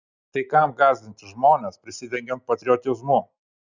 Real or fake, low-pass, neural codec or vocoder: real; 7.2 kHz; none